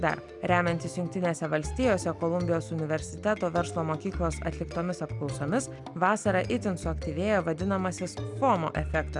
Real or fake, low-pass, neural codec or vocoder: real; 10.8 kHz; none